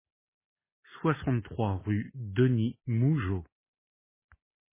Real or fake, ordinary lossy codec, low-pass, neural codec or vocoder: real; MP3, 16 kbps; 3.6 kHz; none